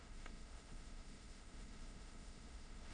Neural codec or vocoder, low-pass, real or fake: autoencoder, 22.05 kHz, a latent of 192 numbers a frame, VITS, trained on many speakers; 9.9 kHz; fake